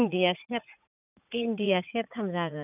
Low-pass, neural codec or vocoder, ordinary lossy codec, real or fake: 3.6 kHz; vocoder, 44.1 kHz, 80 mel bands, Vocos; none; fake